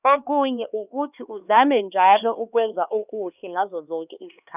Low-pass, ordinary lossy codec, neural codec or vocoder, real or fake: 3.6 kHz; none; codec, 16 kHz, 2 kbps, X-Codec, HuBERT features, trained on LibriSpeech; fake